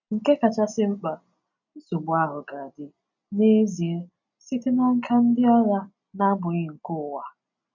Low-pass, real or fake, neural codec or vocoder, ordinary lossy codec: 7.2 kHz; real; none; none